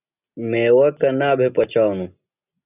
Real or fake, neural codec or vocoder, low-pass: real; none; 3.6 kHz